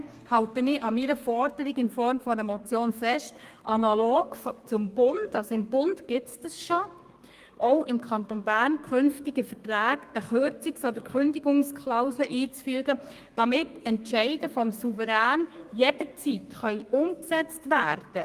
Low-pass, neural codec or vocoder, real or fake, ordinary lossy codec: 14.4 kHz; codec, 32 kHz, 1.9 kbps, SNAC; fake; Opus, 32 kbps